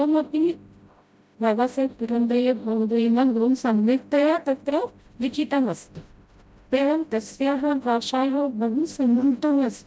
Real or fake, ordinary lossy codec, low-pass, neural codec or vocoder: fake; none; none; codec, 16 kHz, 0.5 kbps, FreqCodec, smaller model